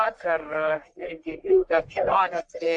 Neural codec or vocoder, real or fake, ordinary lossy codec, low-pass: codec, 44.1 kHz, 1.7 kbps, Pupu-Codec; fake; AAC, 64 kbps; 10.8 kHz